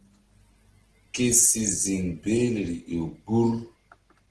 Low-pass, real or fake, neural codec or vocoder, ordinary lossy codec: 10.8 kHz; real; none; Opus, 16 kbps